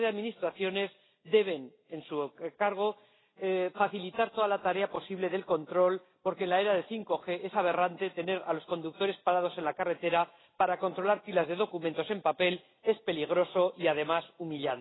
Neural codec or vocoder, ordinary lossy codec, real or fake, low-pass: none; AAC, 16 kbps; real; 7.2 kHz